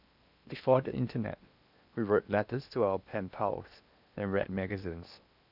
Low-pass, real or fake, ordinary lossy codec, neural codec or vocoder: 5.4 kHz; fake; none; codec, 16 kHz in and 24 kHz out, 0.8 kbps, FocalCodec, streaming, 65536 codes